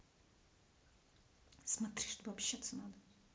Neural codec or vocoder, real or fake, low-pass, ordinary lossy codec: none; real; none; none